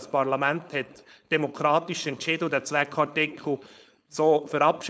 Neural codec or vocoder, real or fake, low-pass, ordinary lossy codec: codec, 16 kHz, 4.8 kbps, FACodec; fake; none; none